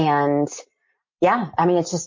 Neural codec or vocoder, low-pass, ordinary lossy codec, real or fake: none; 7.2 kHz; MP3, 32 kbps; real